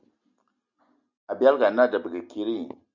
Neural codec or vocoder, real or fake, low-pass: none; real; 7.2 kHz